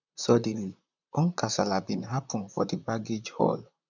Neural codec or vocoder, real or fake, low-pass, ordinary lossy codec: vocoder, 44.1 kHz, 128 mel bands, Pupu-Vocoder; fake; 7.2 kHz; none